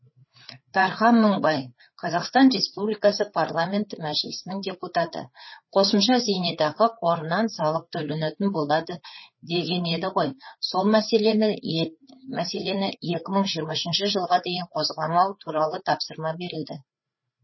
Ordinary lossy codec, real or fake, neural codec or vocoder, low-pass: MP3, 24 kbps; fake; codec, 16 kHz, 4 kbps, FreqCodec, larger model; 7.2 kHz